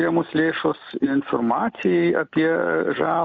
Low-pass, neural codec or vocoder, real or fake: 7.2 kHz; none; real